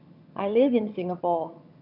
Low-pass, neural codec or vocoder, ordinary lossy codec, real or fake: 5.4 kHz; vocoder, 22.05 kHz, 80 mel bands, HiFi-GAN; none; fake